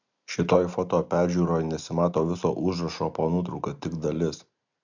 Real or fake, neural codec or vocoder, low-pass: real; none; 7.2 kHz